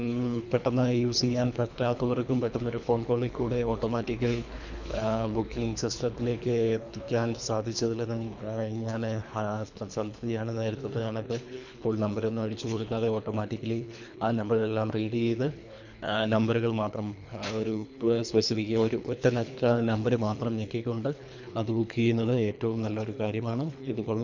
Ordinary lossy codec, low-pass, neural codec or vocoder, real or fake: none; 7.2 kHz; codec, 24 kHz, 3 kbps, HILCodec; fake